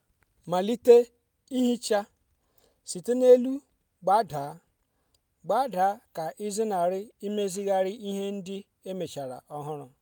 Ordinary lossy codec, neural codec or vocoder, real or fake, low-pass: none; none; real; none